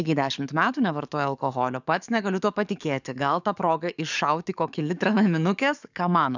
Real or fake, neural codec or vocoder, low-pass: fake; codec, 44.1 kHz, 7.8 kbps, DAC; 7.2 kHz